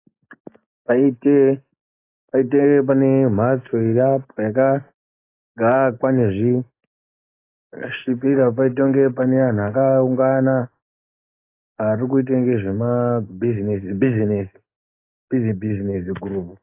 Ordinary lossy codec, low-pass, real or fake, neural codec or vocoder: AAC, 24 kbps; 3.6 kHz; real; none